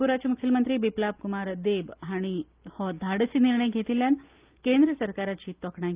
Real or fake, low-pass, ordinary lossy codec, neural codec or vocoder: real; 3.6 kHz; Opus, 24 kbps; none